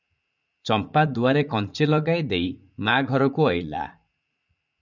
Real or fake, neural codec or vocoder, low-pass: fake; vocoder, 44.1 kHz, 80 mel bands, Vocos; 7.2 kHz